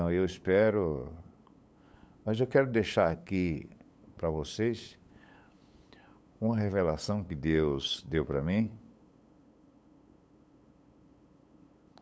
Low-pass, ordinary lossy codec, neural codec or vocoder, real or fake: none; none; codec, 16 kHz, 8 kbps, FunCodec, trained on LibriTTS, 25 frames a second; fake